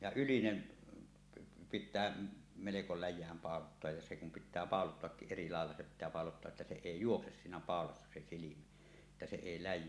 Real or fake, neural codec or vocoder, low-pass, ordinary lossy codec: real; none; none; none